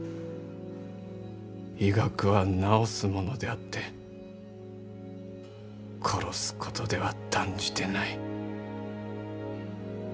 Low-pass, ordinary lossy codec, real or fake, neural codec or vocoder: none; none; real; none